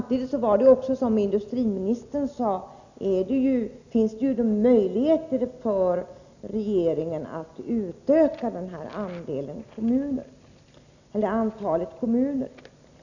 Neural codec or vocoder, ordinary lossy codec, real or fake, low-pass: none; none; real; 7.2 kHz